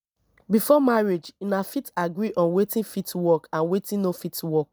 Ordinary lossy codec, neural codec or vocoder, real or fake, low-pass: none; none; real; none